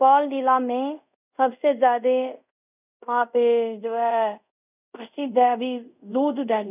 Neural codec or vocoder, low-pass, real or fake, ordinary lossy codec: codec, 24 kHz, 0.5 kbps, DualCodec; 3.6 kHz; fake; none